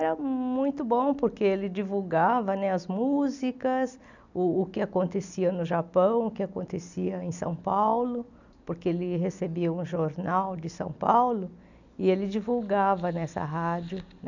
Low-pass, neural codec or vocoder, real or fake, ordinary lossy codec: 7.2 kHz; none; real; none